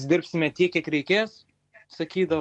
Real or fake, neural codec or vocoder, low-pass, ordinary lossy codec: real; none; 10.8 kHz; MP3, 64 kbps